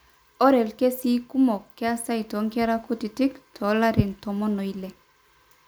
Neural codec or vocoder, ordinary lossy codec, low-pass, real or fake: none; none; none; real